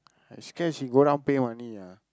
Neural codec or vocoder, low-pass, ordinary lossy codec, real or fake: none; none; none; real